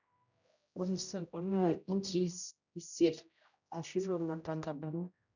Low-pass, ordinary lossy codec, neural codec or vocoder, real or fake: 7.2 kHz; none; codec, 16 kHz, 0.5 kbps, X-Codec, HuBERT features, trained on general audio; fake